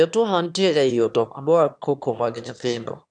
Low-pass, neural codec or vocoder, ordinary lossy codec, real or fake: 9.9 kHz; autoencoder, 22.05 kHz, a latent of 192 numbers a frame, VITS, trained on one speaker; none; fake